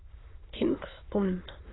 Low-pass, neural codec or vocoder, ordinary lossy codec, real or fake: 7.2 kHz; autoencoder, 22.05 kHz, a latent of 192 numbers a frame, VITS, trained on many speakers; AAC, 16 kbps; fake